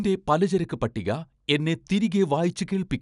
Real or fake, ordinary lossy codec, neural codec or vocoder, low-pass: real; none; none; 10.8 kHz